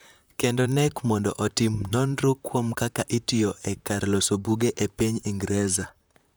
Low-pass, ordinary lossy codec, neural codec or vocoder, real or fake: none; none; vocoder, 44.1 kHz, 128 mel bands, Pupu-Vocoder; fake